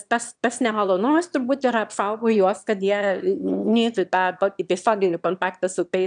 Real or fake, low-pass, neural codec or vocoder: fake; 9.9 kHz; autoencoder, 22.05 kHz, a latent of 192 numbers a frame, VITS, trained on one speaker